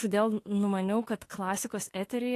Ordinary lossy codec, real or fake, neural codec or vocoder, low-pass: AAC, 48 kbps; fake; autoencoder, 48 kHz, 32 numbers a frame, DAC-VAE, trained on Japanese speech; 14.4 kHz